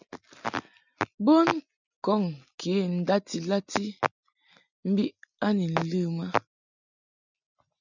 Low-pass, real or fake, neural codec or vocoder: 7.2 kHz; real; none